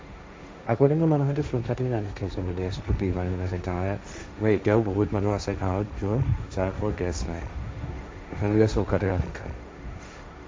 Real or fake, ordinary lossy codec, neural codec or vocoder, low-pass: fake; none; codec, 16 kHz, 1.1 kbps, Voila-Tokenizer; none